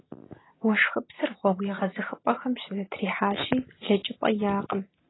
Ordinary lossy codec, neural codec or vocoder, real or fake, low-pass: AAC, 16 kbps; none; real; 7.2 kHz